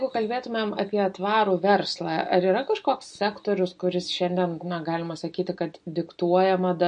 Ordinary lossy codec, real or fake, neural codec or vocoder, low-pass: MP3, 48 kbps; real; none; 9.9 kHz